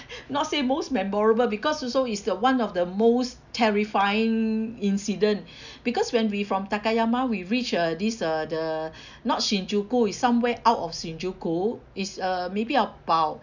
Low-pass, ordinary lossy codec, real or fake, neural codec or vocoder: 7.2 kHz; none; real; none